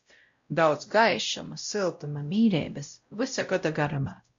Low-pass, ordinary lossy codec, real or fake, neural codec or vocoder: 7.2 kHz; MP3, 48 kbps; fake; codec, 16 kHz, 0.5 kbps, X-Codec, WavLM features, trained on Multilingual LibriSpeech